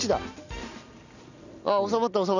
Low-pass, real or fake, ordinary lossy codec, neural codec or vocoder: 7.2 kHz; real; none; none